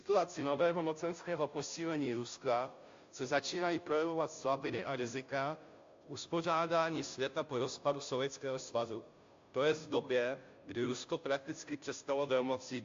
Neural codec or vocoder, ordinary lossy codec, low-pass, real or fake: codec, 16 kHz, 0.5 kbps, FunCodec, trained on Chinese and English, 25 frames a second; AAC, 48 kbps; 7.2 kHz; fake